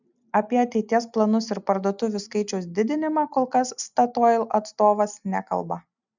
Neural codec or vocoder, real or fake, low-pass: none; real; 7.2 kHz